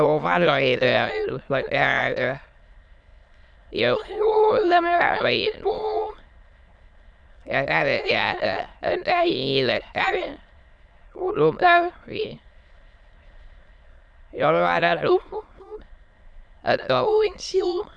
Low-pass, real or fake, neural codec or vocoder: 9.9 kHz; fake; autoencoder, 22.05 kHz, a latent of 192 numbers a frame, VITS, trained on many speakers